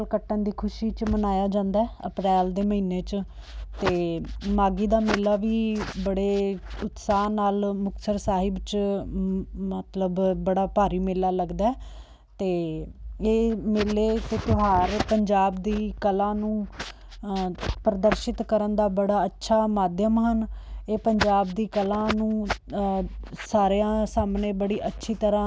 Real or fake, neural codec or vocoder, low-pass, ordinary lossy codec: real; none; none; none